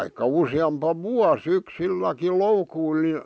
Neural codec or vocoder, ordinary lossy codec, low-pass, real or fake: none; none; none; real